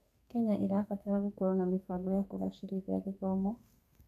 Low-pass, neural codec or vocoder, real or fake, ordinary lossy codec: 14.4 kHz; codec, 32 kHz, 1.9 kbps, SNAC; fake; none